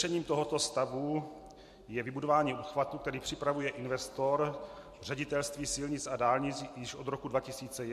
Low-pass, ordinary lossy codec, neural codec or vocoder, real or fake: 14.4 kHz; MP3, 64 kbps; none; real